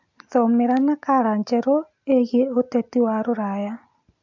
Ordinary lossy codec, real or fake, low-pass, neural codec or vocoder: MP3, 48 kbps; real; 7.2 kHz; none